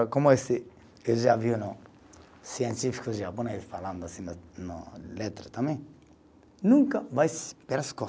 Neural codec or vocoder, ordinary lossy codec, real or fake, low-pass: none; none; real; none